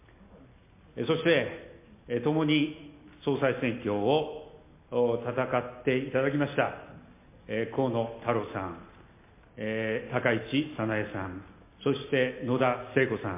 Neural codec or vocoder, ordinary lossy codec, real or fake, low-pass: none; MP3, 24 kbps; real; 3.6 kHz